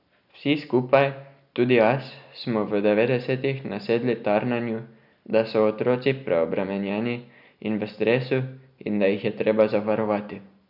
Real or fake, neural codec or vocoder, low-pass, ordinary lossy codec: real; none; 5.4 kHz; none